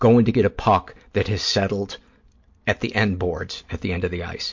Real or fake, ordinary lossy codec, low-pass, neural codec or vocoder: real; MP3, 48 kbps; 7.2 kHz; none